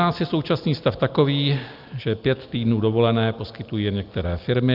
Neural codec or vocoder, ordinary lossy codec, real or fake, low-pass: none; Opus, 24 kbps; real; 5.4 kHz